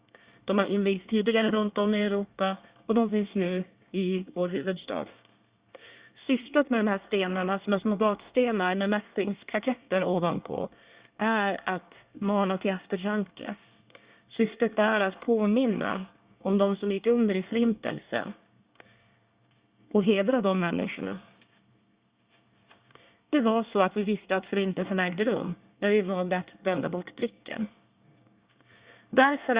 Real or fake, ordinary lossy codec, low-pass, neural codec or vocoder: fake; Opus, 64 kbps; 3.6 kHz; codec, 24 kHz, 1 kbps, SNAC